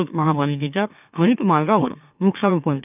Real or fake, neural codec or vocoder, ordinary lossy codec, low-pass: fake; autoencoder, 44.1 kHz, a latent of 192 numbers a frame, MeloTTS; none; 3.6 kHz